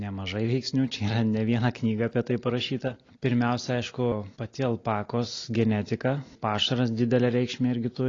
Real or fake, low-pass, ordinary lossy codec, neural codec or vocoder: real; 7.2 kHz; AAC, 32 kbps; none